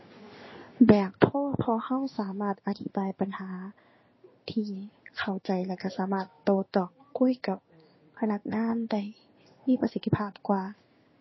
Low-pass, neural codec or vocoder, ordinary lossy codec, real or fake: 7.2 kHz; codec, 16 kHz in and 24 kHz out, 1 kbps, XY-Tokenizer; MP3, 24 kbps; fake